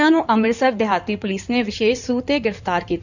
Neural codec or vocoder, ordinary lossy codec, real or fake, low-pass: codec, 16 kHz in and 24 kHz out, 2.2 kbps, FireRedTTS-2 codec; none; fake; 7.2 kHz